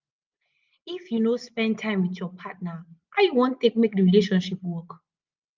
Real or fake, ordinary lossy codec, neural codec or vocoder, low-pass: real; Opus, 24 kbps; none; 7.2 kHz